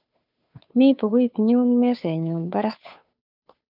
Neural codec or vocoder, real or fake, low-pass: codec, 16 kHz, 2 kbps, FunCodec, trained on Chinese and English, 25 frames a second; fake; 5.4 kHz